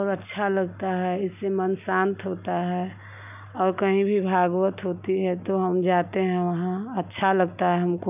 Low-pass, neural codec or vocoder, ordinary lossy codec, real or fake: 3.6 kHz; none; none; real